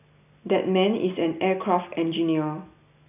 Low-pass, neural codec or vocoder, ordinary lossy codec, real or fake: 3.6 kHz; none; none; real